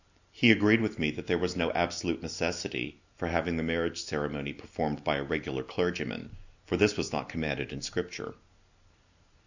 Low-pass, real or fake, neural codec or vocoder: 7.2 kHz; real; none